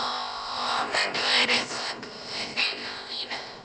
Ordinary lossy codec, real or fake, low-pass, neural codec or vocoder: none; fake; none; codec, 16 kHz, about 1 kbps, DyCAST, with the encoder's durations